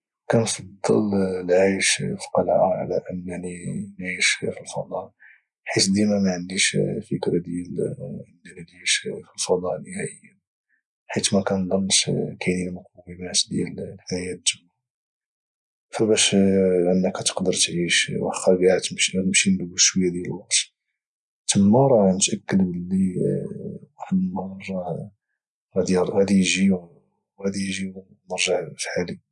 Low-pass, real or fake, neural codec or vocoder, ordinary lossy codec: 10.8 kHz; real; none; none